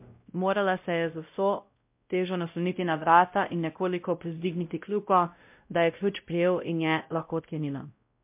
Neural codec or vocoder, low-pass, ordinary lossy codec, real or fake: codec, 16 kHz, 0.5 kbps, X-Codec, WavLM features, trained on Multilingual LibriSpeech; 3.6 kHz; MP3, 32 kbps; fake